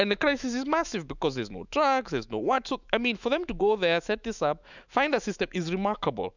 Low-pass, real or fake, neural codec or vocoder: 7.2 kHz; fake; autoencoder, 48 kHz, 128 numbers a frame, DAC-VAE, trained on Japanese speech